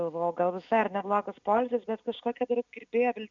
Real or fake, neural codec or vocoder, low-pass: real; none; 7.2 kHz